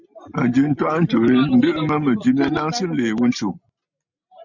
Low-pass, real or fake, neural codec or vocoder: 7.2 kHz; real; none